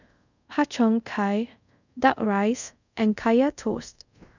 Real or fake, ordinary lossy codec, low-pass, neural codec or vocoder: fake; none; 7.2 kHz; codec, 24 kHz, 0.5 kbps, DualCodec